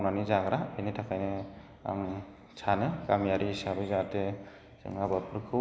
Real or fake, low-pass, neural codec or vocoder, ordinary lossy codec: real; none; none; none